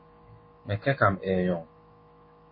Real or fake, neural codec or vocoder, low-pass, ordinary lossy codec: fake; autoencoder, 48 kHz, 128 numbers a frame, DAC-VAE, trained on Japanese speech; 5.4 kHz; MP3, 24 kbps